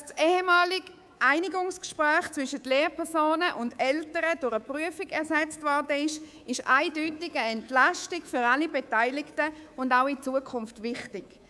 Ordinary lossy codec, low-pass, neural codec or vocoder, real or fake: none; none; codec, 24 kHz, 3.1 kbps, DualCodec; fake